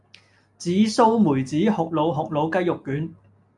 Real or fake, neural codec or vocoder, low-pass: real; none; 10.8 kHz